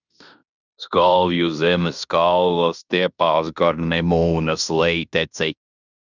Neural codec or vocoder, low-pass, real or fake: codec, 16 kHz in and 24 kHz out, 0.9 kbps, LongCat-Audio-Codec, fine tuned four codebook decoder; 7.2 kHz; fake